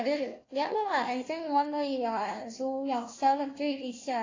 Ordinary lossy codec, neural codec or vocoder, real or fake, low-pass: AAC, 32 kbps; codec, 16 kHz, 1 kbps, FunCodec, trained on Chinese and English, 50 frames a second; fake; 7.2 kHz